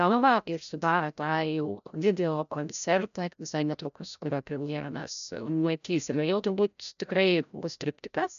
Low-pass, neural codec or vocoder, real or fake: 7.2 kHz; codec, 16 kHz, 0.5 kbps, FreqCodec, larger model; fake